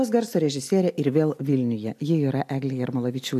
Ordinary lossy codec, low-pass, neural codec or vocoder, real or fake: AAC, 64 kbps; 14.4 kHz; none; real